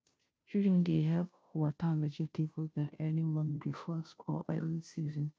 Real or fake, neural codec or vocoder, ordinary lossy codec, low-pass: fake; codec, 16 kHz, 0.5 kbps, FunCodec, trained on Chinese and English, 25 frames a second; none; none